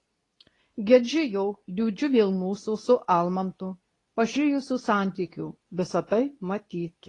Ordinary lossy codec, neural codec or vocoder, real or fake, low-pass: AAC, 32 kbps; codec, 24 kHz, 0.9 kbps, WavTokenizer, medium speech release version 2; fake; 10.8 kHz